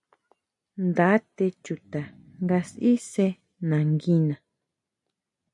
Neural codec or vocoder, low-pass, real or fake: none; 10.8 kHz; real